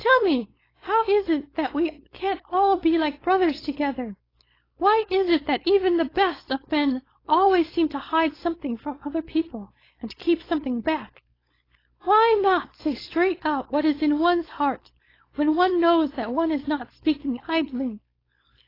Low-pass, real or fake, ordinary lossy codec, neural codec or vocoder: 5.4 kHz; fake; AAC, 24 kbps; codec, 16 kHz, 4.8 kbps, FACodec